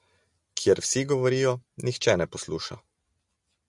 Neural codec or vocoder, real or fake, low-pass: none; real; 10.8 kHz